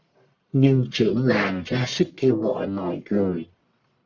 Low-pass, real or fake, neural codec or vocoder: 7.2 kHz; fake; codec, 44.1 kHz, 1.7 kbps, Pupu-Codec